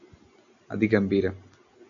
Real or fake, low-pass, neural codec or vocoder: real; 7.2 kHz; none